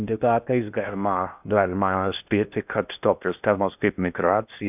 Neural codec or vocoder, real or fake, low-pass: codec, 16 kHz in and 24 kHz out, 0.6 kbps, FocalCodec, streaming, 2048 codes; fake; 3.6 kHz